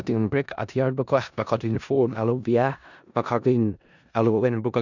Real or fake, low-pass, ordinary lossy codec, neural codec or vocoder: fake; 7.2 kHz; none; codec, 16 kHz in and 24 kHz out, 0.4 kbps, LongCat-Audio-Codec, four codebook decoder